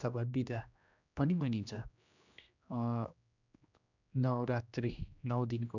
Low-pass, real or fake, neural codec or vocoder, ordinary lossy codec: 7.2 kHz; fake; codec, 16 kHz, 1 kbps, X-Codec, HuBERT features, trained on general audio; none